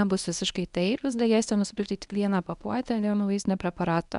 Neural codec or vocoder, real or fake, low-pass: codec, 24 kHz, 0.9 kbps, WavTokenizer, medium speech release version 1; fake; 10.8 kHz